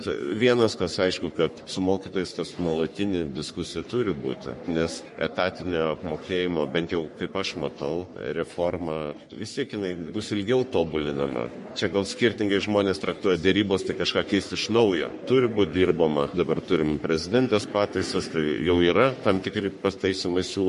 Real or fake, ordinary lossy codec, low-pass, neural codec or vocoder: fake; MP3, 48 kbps; 14.4 kHz; codec, 44.1 kHz, 3.4 kbps, Pupu-Codec